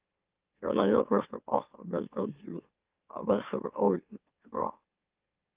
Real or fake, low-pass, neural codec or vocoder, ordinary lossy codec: fake; 3.6 kHz; autoencoder, 44.1 kHz, a latent of 192 numbers a frame, MeloTTS; Opus, 24 kbps